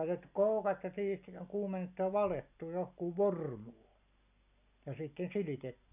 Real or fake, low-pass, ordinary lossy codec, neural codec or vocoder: real; 3.6 kHz; none; none